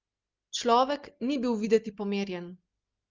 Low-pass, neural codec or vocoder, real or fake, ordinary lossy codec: 7.2 kHz; none; real; Opus, 24 kbps